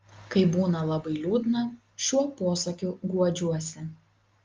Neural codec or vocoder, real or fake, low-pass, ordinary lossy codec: none; real; 7.2 kHz; Opus, 32 kbps